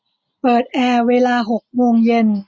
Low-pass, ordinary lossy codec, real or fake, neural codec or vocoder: none; none; real; none